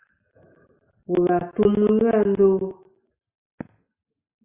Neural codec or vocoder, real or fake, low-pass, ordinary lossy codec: none; real; 3.6 kHz; Opus, 64 kbps